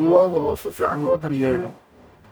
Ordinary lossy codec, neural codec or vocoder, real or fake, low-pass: none; codec, 44.1 kHz, 0.9 kbps, DAC; fake; none